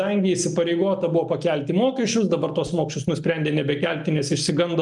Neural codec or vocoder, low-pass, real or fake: none; 10.8 kHz; real